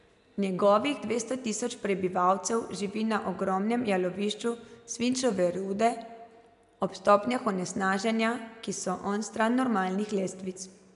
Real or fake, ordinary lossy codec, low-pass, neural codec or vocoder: real; none; 10.8 kHz; none